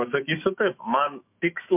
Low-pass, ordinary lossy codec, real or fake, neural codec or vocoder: 3.6 kHz; MP3, 32 kbps; fake; autoencoder, 48 kHz, 128 numbers a frame, DAC-VAE, trained on Japanese speech